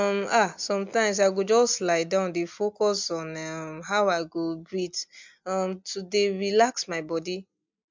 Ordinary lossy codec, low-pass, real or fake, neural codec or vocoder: MP3, 64 kbps; 7.2 kHz; real; none